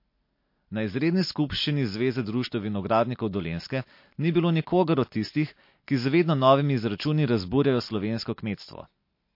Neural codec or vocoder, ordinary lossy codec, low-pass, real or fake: none; MP3, 32 kbps; 5.4 kHz; real